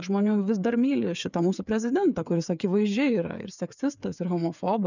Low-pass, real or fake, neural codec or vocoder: 7.2 kHz; fake; codec, 16 kHz, 8 kbps, FreqCodec, smaller model